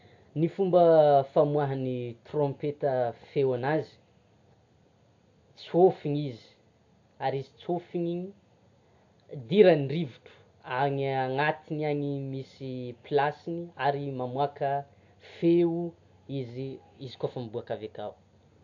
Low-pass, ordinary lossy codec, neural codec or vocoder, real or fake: 7.2 kHz; AAC, 48 kbps; none; real